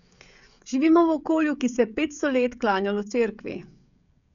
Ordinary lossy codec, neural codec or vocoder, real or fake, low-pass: none; codec, 16 kHz, 16 kbps, FreqCodec, smaller model; fake; 7.2 kHz